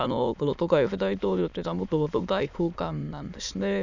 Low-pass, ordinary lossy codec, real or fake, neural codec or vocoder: 7.2 kHz; none; fake; autoencoder, 22.05 kHz, a latent of 192 numbers a frame, VITS, trained on many speakers